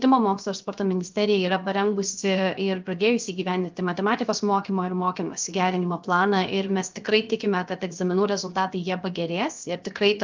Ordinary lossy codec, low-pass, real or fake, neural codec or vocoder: Opus, 24 kbps; 7.2 kHz; fake; codec, 16 kHz, 0.7 kbps, FocalCodec